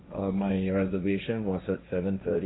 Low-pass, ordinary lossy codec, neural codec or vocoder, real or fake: 7.2 kHz; AAC, 16 kbps; codec, 16 kHz, 1.1 kbps, Voila-Tokenizer; fake